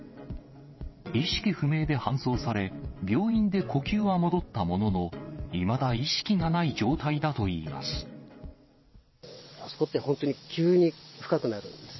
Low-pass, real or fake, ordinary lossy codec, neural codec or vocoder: 7.2 kHz; fake; MP3, 24 kbps; vocoder, 22.05 kHz, 80 mel bands, WaveNeXt